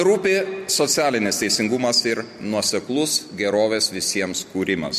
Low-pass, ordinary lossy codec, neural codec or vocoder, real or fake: 14.4 kHz; MP3, 64 kbps; none; real